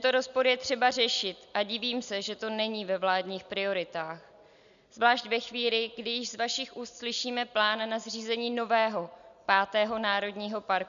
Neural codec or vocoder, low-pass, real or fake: none; 7.2 kHz; real